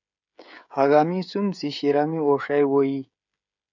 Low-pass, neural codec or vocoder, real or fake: 7.2 kHz; codec, 16 kHz, 16 kbps, FreqCodec, smaller model; fake